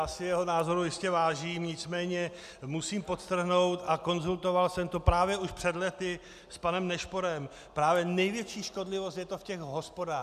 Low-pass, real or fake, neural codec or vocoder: 14.4 kHz; real; none